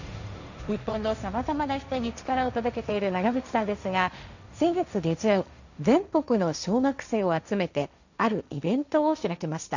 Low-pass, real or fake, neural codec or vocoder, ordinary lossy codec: 7.2 kHz; fake; codec, 16 kHz, 1.1 kbps, Voila-Tokenizer; none